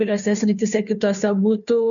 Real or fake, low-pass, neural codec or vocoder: fake; 7.2 kHz; codec, 16 kHz, 2 kbps, FunCodec, trained on Chinese and English, 25 frames a second